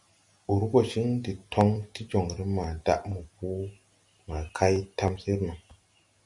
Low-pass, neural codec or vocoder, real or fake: 10.8 kHz; none; real